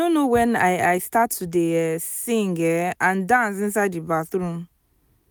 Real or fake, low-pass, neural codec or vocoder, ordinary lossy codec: real; none; none; none